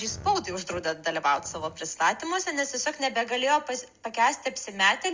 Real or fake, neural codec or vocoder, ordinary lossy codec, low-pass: real; none; Opus, 32 kbps; 7.2 kHz